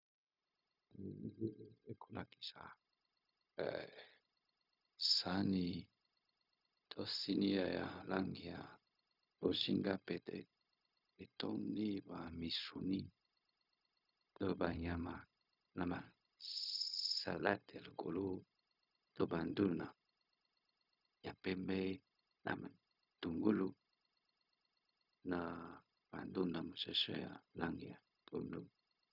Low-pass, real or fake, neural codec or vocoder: 5.4 kHz; fake; codec, 16 kHz, 0.4 kbps, LongCat-Audio-Codec